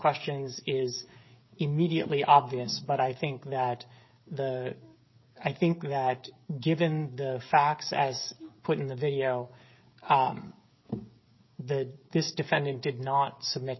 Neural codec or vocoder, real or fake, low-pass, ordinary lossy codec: codec, 16 kHz, 16 kbps, FreqCodec, smaller model; fake; 7.2 kHz; MP3, 24 kbps